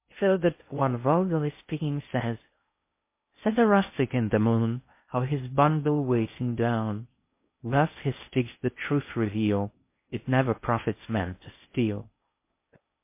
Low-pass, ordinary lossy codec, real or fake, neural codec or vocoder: 3.6 kHz; MP3, 24 kbps; fake; codec, 16 kHz in and 24 kHz out, 0.6 kbps, FocalCodec, streaming, 2048 codes